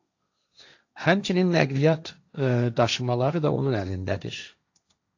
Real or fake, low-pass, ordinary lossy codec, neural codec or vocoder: fake; 7.2 kHz; AAC, 48 kbps; codec, 16 kHz, 1.1 kbps, Voila-Tokenizer